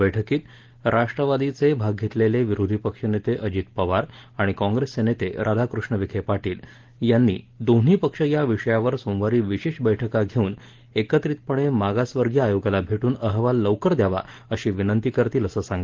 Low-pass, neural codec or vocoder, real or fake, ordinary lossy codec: 7.2 kHz; none; real; Opus, 16 kbps